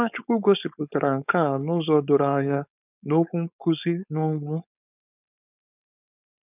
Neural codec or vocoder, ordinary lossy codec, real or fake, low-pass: codec, 16 kHz, 4.8 kbps, FACodec; none; fake; 3.6 kHz